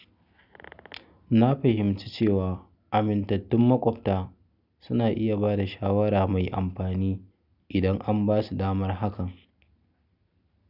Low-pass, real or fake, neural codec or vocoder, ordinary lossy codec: 5.4 kHz; real; none; none